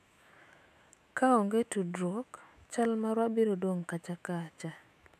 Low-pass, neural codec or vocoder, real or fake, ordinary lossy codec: 14.4 kHz; autoencoder, 48 kHz, 128 numbers a frame, DAC-VAE, trained on Japanese speech; fake; none